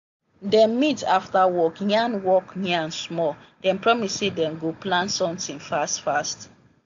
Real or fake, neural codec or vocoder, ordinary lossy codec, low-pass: real; none; AAC, 64 kbps; 7.2 kHz